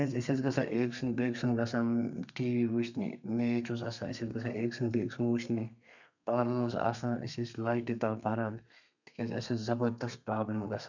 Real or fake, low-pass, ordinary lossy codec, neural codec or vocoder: fake; 7.2 kHz; none; codec, 32 kHz, 1.9 kbps, SNAC